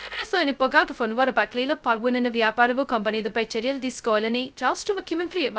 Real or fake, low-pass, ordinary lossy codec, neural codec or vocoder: fake; none; none; codec, 16 kHz, 0.2 kbps, FocalCodec